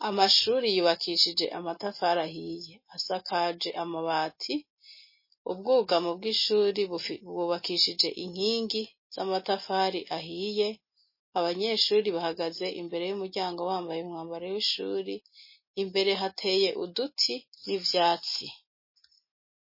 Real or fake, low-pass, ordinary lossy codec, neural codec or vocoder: real; 5.4 kHz; MP3, 24 kbps; none